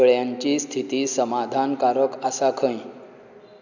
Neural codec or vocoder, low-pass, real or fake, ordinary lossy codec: none; 7.2 kHz; real; none